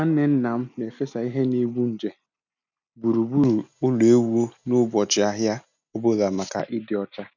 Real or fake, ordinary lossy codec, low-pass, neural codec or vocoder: real; none; 7.2 kHz; none